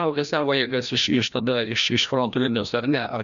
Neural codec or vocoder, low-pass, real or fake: codec, 16 kHz, 1 kbps, FreqCodec, larger model; 7.2 kHz; fake